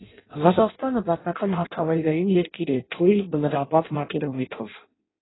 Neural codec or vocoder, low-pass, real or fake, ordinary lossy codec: codec, 16 kHz in and 24 kHz out, 0.6 kbps, FireRedTTS-2 codec; 7.2 kHz; fake; AAC, 16 kbps